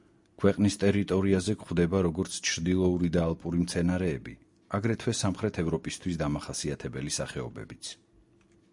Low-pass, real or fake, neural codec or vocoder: 10.8 kHz; real; none